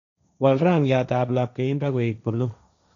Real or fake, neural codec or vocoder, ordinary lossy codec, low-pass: fake; codec, 16 kHz, 1.1 kbps, Voila-Tokenizer; none; 7.2 kHz